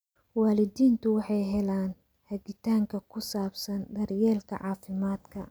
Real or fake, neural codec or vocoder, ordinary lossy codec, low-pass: real; none; none; none